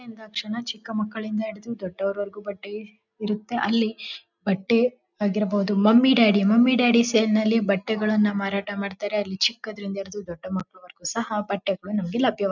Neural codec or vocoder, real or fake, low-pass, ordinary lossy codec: none; real; 7.2 kHz; none